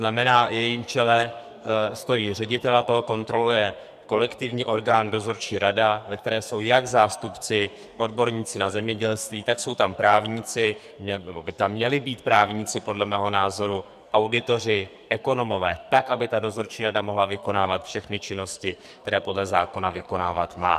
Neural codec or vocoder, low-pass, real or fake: codec, 44.1 kHz, 2.6 kbps, SNAC; 14.4 kHz; fake